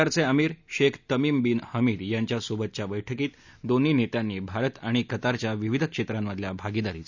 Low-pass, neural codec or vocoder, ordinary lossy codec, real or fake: 7.2 kHz; none; none; real